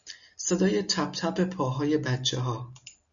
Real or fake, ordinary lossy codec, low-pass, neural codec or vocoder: real; MP3, 96 kbps; 7.2 kHz; none